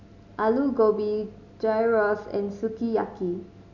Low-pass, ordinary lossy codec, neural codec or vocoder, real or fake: 7.2 kHz; none; none; real